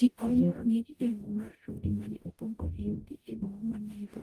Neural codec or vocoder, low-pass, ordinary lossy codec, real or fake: codec, 44.1 kHz, 0.9 kbps, DAC; 14.4 kHz; Opus, 32 kbps; fake